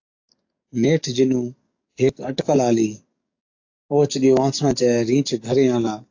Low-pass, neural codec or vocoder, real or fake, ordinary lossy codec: 7.2 kHz; codec, 44.1 kHz, 7.8 kbps, DAC; fake; AAC, 48 kbps